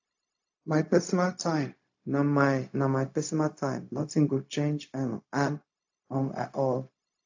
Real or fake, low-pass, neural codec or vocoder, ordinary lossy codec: fake; 7.2 kHz; codec, 16 kHz, 0.4 kbps, LongCat-Audio-Codec; AAC, 48 kbps